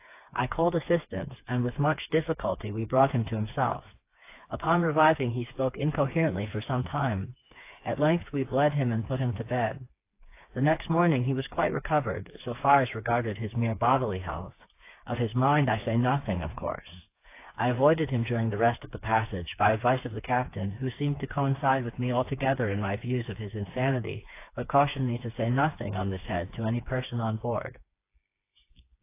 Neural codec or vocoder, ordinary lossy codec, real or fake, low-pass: codec, 16 kHz, 4 kbps, FreqCodec, smaller model; AAC, 24 kbps; fake; 3.6 kHz